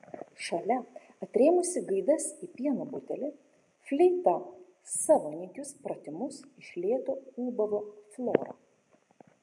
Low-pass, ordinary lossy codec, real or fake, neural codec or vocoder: 10.8 kHz; MP3, 48 kbps; real; none